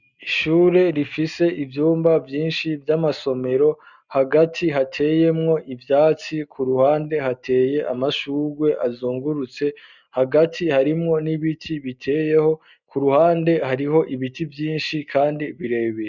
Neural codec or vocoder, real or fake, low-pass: none; real; 7.2 kHz